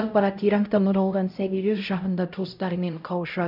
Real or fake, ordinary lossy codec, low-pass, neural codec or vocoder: fake; none; 5.4 kHz; codec, 16 kHz, 0.5 kbps, X-Codec, HuBERT features, trained on LibriSpeech